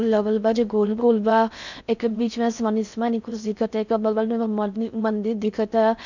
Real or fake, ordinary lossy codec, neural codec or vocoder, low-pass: fake; none; codec, 16 kHz in and 24 kHz out, 0.6 kbps, FocalCodec, streaming, 4096 codes; 7.2 kHz